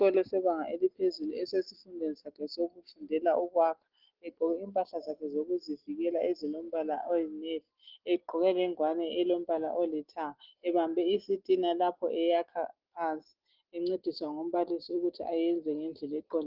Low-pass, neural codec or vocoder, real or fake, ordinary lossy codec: 5.4 kHz; none; real; Opus, 16 kbps